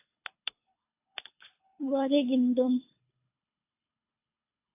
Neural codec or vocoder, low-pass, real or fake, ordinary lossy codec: codec, 24 kHz, 3 kbps, HILCodec; 3.6 kHz; fake; AAC, 24 kbps